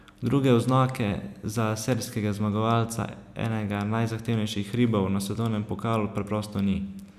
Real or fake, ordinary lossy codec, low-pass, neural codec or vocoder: real; none; 14.4 kHz; none